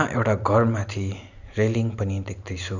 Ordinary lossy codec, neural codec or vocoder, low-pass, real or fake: none; none; 7.2 kHz; real